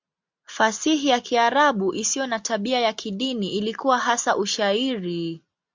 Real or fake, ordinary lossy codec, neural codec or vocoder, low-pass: real; MP3, 64 kbps; none; 7.2 kHz